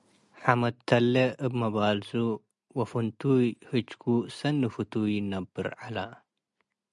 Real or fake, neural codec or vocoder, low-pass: real; none; 10.8 kHz